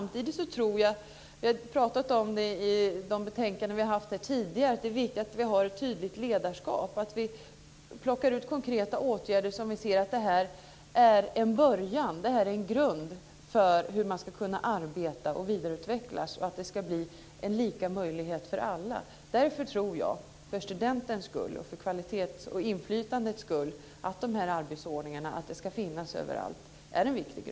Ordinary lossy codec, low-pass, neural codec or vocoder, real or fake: none; none; none; real